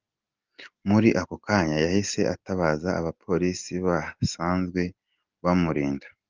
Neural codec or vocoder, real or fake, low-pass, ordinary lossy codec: none; real; 7.2 kHz; Opus, 24 kbps